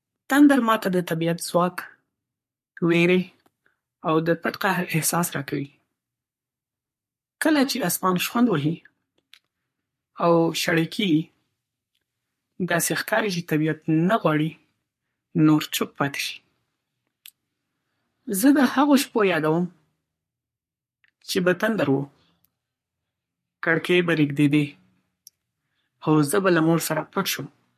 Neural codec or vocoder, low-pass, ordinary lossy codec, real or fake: codec, 44.1 kHz, 3.4 kbps, Pupu-Codec; 14.4 kHz; MP3, 64 kbps; fake